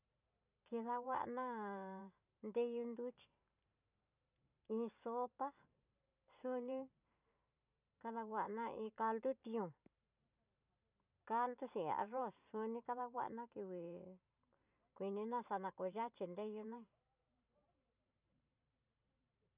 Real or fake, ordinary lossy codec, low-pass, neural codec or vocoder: fake; none; 3.6 kHz; vocoder, 44.1 kHz, 128 mel bands every 512 samples, BigVGAN v2